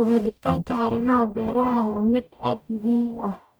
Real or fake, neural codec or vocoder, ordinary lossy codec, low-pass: fake; codec, 44.1 kHz, 0.9 kbps, DAC; none; none